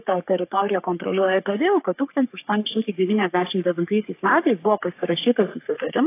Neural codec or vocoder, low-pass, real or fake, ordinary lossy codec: codec, 44.1 kHz, 3.4 kbps, Pupu-Codec; 3.6 kHz; fake; AAC, 24 kbps